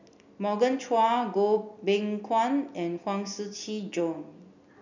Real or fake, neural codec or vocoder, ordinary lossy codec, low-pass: real; none; none; 7.2 kHz